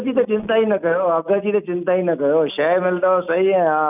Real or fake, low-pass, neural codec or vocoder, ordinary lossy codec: real; 3.6 kHz; none; none